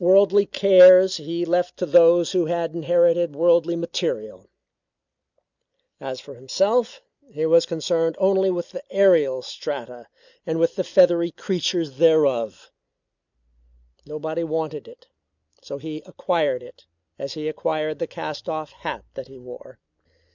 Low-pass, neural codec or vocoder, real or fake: 7.2 kHz; none; real